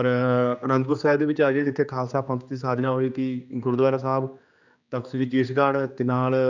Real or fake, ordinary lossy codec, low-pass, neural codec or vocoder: fake; none; 7.2 kHz; codec, 16 kHz, 2 kbps, X-Codec, HuBERT features, trained on general audio